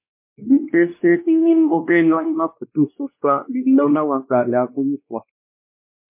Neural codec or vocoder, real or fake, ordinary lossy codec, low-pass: codec, 16 kHz, 1 kbps, X-Codec, WavLM features, trained on Multilingual LibriSpeech; fake; MP3, 24 kbps; 3.6 kHz